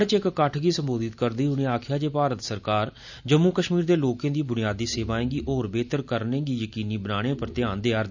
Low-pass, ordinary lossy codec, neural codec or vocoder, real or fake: 7.2 kHz; none; none; real